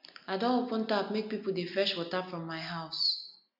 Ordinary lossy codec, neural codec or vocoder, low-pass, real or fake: MP3, 48 kbps; none; 5.4 kHz; real